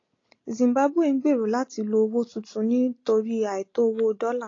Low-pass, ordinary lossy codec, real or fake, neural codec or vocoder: 7.2 kHz; AAC, 32 kbps; real; none